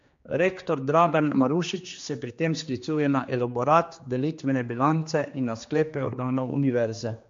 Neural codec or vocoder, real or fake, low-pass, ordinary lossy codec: codec, 16 kHz, 2 kbps, X-Codec, HuBERT features, trained on general audio; fake; 7.2 kHz; MP3, 48 kbps